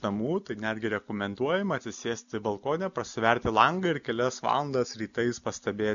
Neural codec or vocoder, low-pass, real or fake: none; 7.2 kHz; real